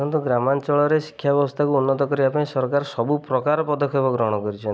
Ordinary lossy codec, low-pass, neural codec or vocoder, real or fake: none; none; none; real